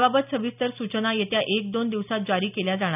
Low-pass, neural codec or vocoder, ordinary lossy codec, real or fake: 3.6 kHz; none; none; real